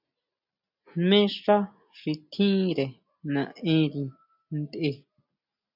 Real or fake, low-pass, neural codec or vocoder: real; 5.4 kHz; none